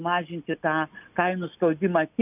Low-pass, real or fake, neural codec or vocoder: 3.6 kHz; real; none